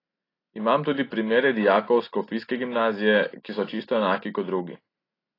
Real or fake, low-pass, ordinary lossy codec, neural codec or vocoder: real; 5.4 kHz; AAC, 24 kbps; none